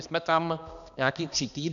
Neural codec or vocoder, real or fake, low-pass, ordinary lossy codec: codec, 16 kHz, 2 kbps, X-Codec, HuBERT features, trained on balanced general audio; fake; 7.2 kHz; MP3, 96 kbps